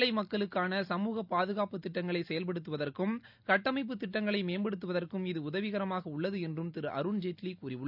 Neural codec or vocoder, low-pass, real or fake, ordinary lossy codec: none; 5.4 kHz; real; none